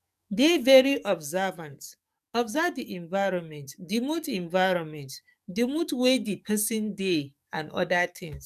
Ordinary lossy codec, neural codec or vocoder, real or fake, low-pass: none; codec, 44.1 kHz, 7.8 kbps, DAC; fake; 14.4 kHz